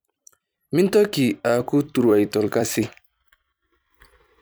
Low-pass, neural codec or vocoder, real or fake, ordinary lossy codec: none; vocoder, 44.1 kHz, 128 mel bands every 256 samples, BigVGAN v2; fake; none